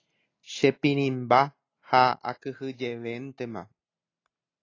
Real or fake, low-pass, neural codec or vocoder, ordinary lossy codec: real; 7.2 kHz; none; AAC, 32 kbps